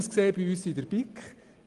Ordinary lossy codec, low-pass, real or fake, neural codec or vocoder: Opus, 24 kbps; 10.8 kHz; real; none